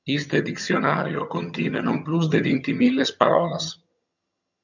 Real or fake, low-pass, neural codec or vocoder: fake; 7.2 kHz; vocoder, 22.05 kHz, 80 mel bands, HiFi-GAN